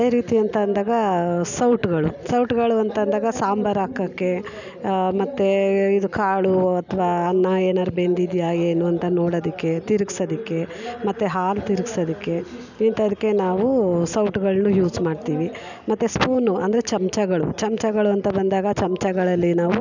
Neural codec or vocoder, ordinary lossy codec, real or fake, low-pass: none; none; real; 7.2 kHz